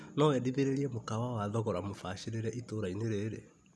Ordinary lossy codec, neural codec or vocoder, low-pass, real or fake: none; none; none; real